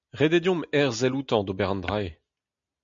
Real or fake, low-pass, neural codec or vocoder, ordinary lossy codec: real; 7.2 kHz; none; MP3, 64 kbps